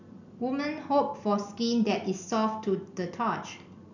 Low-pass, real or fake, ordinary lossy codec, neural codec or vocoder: 7.2 kHz; real; none; none